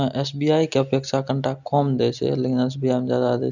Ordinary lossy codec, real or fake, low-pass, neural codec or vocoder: none; real; 7.2 kHz; none